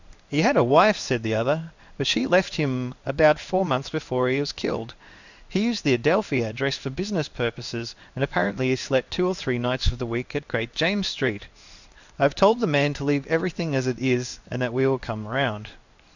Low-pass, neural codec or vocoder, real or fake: 7.2 kHz; codec, 16 kHz in and 24 kHz out, 1 kbps, XY-Tokenizer; fake